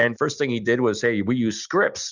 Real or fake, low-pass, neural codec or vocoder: real; 7.2 kHz; none